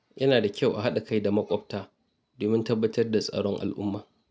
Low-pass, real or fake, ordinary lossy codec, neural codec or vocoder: none; real; none; none